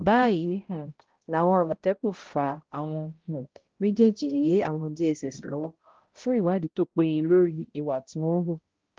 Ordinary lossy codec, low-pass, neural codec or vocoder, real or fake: Opus, 16 kbps; 7.2 kHz; codec, 16 kHz, 0.5 kbps, X-Codec, HuBERT features, trained on balanced general audio; fake